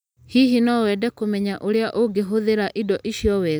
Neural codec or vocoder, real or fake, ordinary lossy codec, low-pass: none; real; none; none